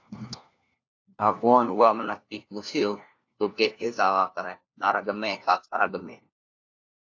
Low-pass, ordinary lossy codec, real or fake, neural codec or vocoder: 7.2 kHz; AAC, 48 kbps; fake; codec, 16 kHz, 1 kbps, FunCodec, trained on LibriTTS, 50 frames a second